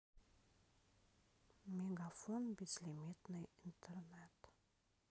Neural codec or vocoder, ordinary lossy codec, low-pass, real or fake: none; none; none; real